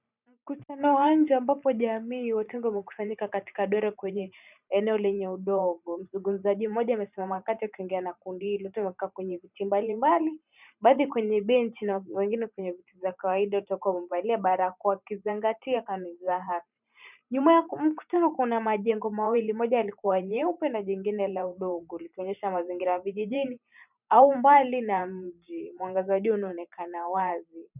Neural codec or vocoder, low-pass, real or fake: vocoder, 44.1 kHz, 128 mel bands every 512 samples, BigVGAN v2; 3.6 kHz; fake